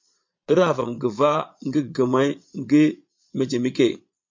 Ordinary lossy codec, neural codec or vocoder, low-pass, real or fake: MP3, 48 kbps; vocoder, 22.05 kHz, 80 mel bands, Vocos; 7.2 kHz; fake